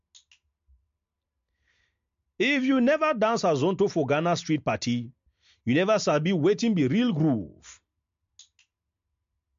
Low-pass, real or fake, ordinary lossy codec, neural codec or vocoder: 7.2 kHz; real; MP3, 48 kbps; none